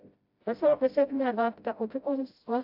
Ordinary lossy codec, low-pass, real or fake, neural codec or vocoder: AAC, 48 kbps; 5.4 kHz; fake; codec, 16 kHz, 0.5 kbps, FreqCodec, smaller model